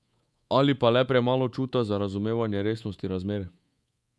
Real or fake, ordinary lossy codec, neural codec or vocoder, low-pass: fake; none; codec, 24 kHz, 3.1 kbps, DualCodec; none